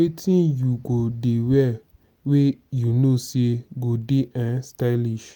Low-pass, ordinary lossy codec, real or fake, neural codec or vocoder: none; none; real; none